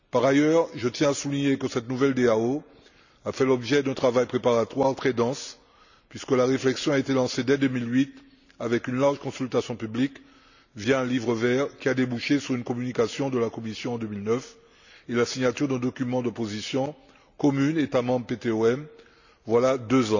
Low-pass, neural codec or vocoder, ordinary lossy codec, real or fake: 7.2 kHz; none; none; real